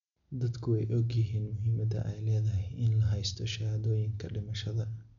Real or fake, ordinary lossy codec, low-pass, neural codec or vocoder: real; none; 7.2 kHz; none